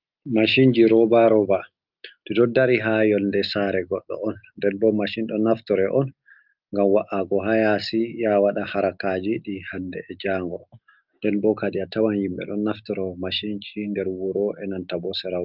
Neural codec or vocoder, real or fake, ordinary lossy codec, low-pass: none; real; Opus, 24 kbps; 5.4 kHz